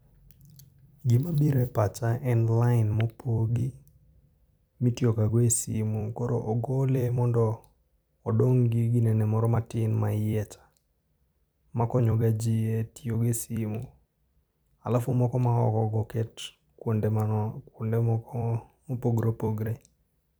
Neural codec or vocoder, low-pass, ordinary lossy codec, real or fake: vocoder, 44.1 kHz, 128 mel bands every 256 samples, BigVGAN v2; none; none; fake